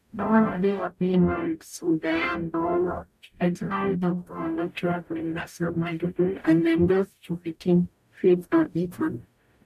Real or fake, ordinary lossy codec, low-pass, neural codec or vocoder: fake; none; 14.4 kHz; codec, 44.1 kHz, 0.9 kbps, DAC